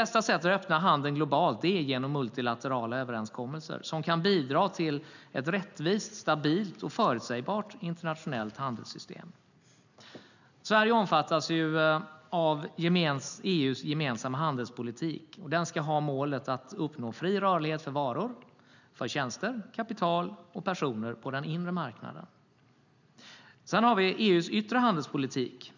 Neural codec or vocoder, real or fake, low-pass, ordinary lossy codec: none; real; 7.2 kHz; none